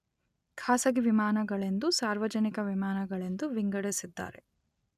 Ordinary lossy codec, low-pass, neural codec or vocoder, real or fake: none; 14.4 kHz; none; real